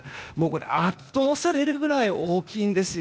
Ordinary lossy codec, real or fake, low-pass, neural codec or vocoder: none; fake; none; codec, 16 kHz, 0.8 kbps, ZipCodec